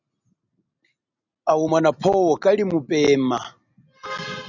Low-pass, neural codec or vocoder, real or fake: 7.2 kHz; none; real